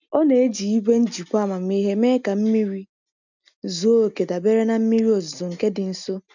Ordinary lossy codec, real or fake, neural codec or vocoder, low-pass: none; real; none; 7.2 kHz